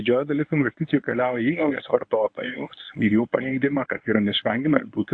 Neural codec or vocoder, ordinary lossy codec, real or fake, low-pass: codec, 24 kHz, 0.9 kbps, WavTokenizer, medium speech release version 1; AAC, 48 kbps; fake; 9.9 kHz